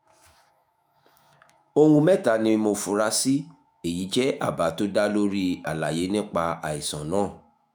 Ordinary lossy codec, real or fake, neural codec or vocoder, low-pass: none; fake; autoencoder, 48 kHz, 128 numbers a frame, DAC-VAE, trained on Japanese speech; none